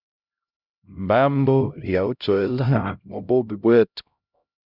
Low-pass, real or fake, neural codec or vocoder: 5.4 kHz; fake; codec, 16 kHz, 0.5 kbps, X-Codec, HuBERT features, trained on LibriSpeech